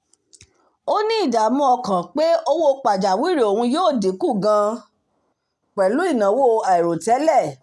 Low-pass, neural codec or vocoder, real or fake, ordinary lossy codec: none; none; real; none